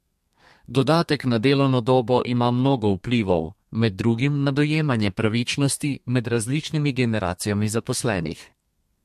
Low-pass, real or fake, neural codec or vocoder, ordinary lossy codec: 14.4 kHz; fake; codec, 32 kHz, 1.9 kbps, SNAC; MP3, 64 kbps